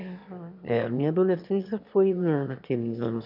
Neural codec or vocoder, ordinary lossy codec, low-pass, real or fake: autoencoder, 22.05 kHz, a latent of 192 numbers a frame, VITS, trained on one speaker; none; 5.4 kHz; fake